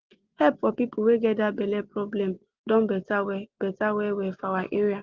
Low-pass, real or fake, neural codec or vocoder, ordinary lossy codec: 7.2 kHz; real; none; Opus, 16 kbps